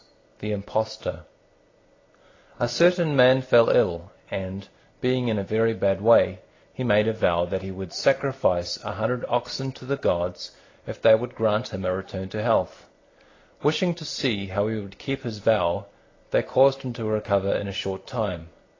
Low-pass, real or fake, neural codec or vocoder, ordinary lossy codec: 7.2 kHz; real; none; AAC, 32 kbps